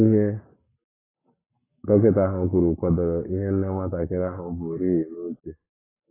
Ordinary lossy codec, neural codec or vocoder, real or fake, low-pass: AAC, 16 kbps; codec, 44.1 kHz, 7.8 kbps, Pupu-Codec; fake; 3.6 kHz